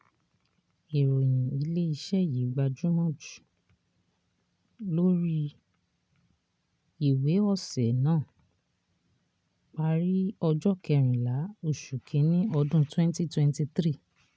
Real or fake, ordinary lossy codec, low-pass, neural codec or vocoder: real; none; none; none